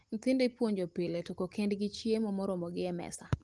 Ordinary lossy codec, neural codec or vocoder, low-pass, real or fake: Opus, 24 kbps; none; 10.8 kHz; real